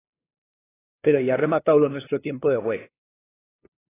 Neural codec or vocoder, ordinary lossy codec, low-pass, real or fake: codec, 16 kHz, 2 kbps, FunCodec, trained on LibriTTS, 25 frames a second; AAC, 16 kbps; 3.6 kHz; fake